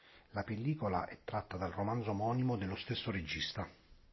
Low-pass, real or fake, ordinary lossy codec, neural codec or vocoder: 7.2 kHz; real; MP3, 24 kbps; none